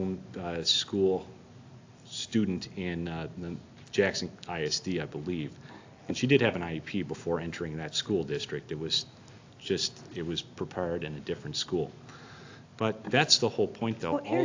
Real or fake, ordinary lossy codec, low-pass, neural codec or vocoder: real; AAC, 48 kbps; 7.2 kHz; none